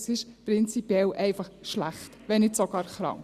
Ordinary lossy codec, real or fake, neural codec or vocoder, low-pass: Opus, 64 kbps; real; none; 14.4 kHz